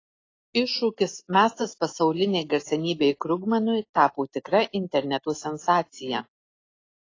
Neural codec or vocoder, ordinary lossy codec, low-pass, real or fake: none; AAC, 32 kbps; 7.2 kHz; real